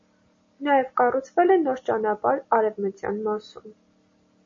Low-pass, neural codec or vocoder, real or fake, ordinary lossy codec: 7.2 kHz; none; real; MP3, 32 kbps